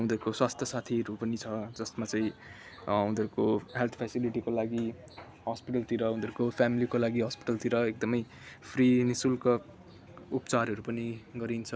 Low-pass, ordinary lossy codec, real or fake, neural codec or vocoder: none; none; real; none